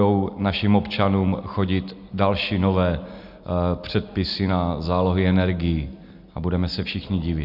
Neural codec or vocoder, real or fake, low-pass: none; real; 5.4 kHz